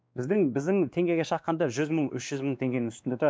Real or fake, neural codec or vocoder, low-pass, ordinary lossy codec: fake; codec, 16 kHz, 2 kbps, X-Codec, WavLM features, trained on Multilingual LibriSpeech; none; none